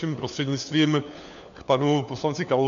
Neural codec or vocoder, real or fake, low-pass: codec, 16 kHz, 4 kbps, FunCodec, trained on LibriTTS, 50 frames a second; fake; 7.2 kHz